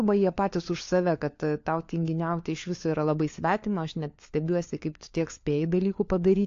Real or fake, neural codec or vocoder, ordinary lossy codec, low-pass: fake; codec, 16 kHz, 4 kbps, FunCodec, trained on LibriTTS, 50 frames a second; AAC, 48 kbps; 7.2 kHz